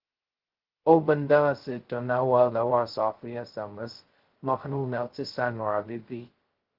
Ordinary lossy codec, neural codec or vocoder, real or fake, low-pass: Opus, 16 kbps; codec, 16 kHz, 0.2 kbps, FocalCodec; fake; 5.4 kHz